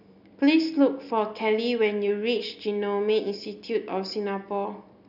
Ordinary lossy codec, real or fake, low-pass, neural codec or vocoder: none; real; 5.4 kHz; none